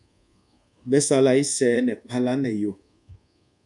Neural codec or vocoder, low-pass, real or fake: codec, 24 kHz, 1.2 kbps, DualCodec; 10.8 kHz; fake